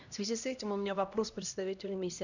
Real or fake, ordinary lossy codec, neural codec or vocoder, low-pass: fake; none; codec, 16 kHz, 1 kbps, X-Codec, HuBERT features, trained on LibriSpeech; 7.2 kHz